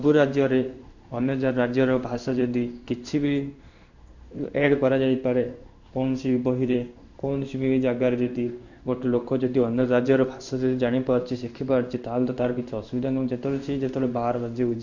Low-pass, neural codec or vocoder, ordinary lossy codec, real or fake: 7.2 kHz; codec, 16 kHz in and 24 kHz out, 1 kbps, XY-Tokenizer; none; fake